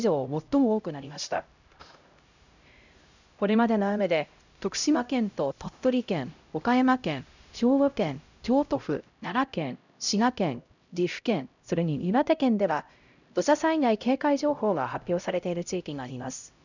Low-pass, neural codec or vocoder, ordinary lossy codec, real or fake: 7.2 kHz; codec, 16 kHz, 0.5 kbps, X-Codec, HuBERT features, trained on LibriSpeech; none; fake